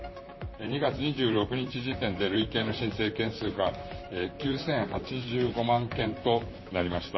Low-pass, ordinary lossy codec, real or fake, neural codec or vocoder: 7.2 kHz; MP3, 24 kbps; fake; vocoder, 44.1 kHz, 128 mel bands, Pupu-Vocoder